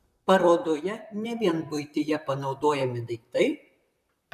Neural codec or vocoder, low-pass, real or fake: vocoder, 44.1 kHz, 128 mel bands, Pupu-Vocoder; 14.4 kHz; fake